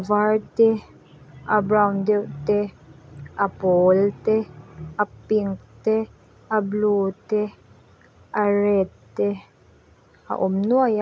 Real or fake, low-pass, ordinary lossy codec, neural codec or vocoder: real; none; none; none